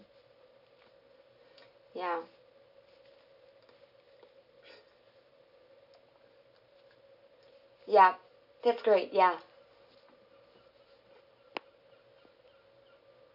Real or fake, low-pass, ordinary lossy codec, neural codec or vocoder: real; 5.4 kHz; none; none